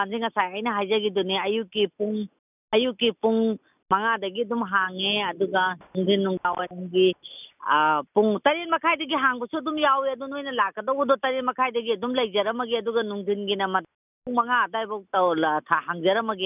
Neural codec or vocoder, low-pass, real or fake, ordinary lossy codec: none; 3.6 kHz; real; none